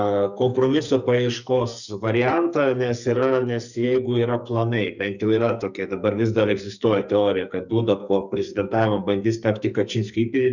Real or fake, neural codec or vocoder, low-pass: fake; codec, 44.1 kHz, 2.6 kbps, SNAC; 7.2 kHz